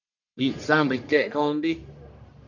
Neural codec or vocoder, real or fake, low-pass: codec, 44.1 kHz, 1.7 kbps, Pupu-Codec; fake; 7.2 kHz